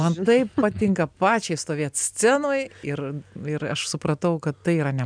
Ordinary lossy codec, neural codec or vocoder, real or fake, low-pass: MP3, 96 kbps; none; real; 9.9 kHz